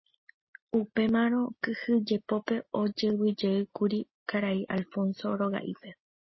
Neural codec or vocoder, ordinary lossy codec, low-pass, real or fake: none; MP3, 24 kbps; 7.2 kHz; real